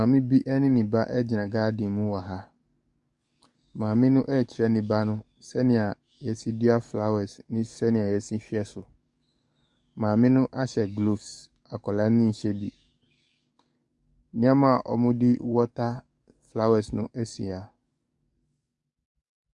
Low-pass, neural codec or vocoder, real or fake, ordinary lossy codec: 10.8 kHz; codec, 44.1 kHz, 7.8 kbps, DAC; fake; Opus, 64 kbps